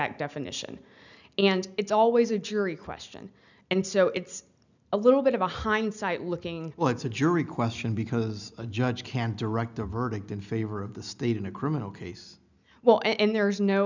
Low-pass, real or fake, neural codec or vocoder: 7.2 kHz; real; none